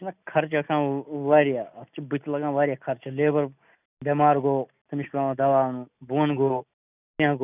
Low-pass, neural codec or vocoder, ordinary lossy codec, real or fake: 3.6 kHz; none; none; real